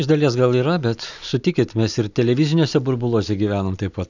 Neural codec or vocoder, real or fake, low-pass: none; real; 7.2 kHz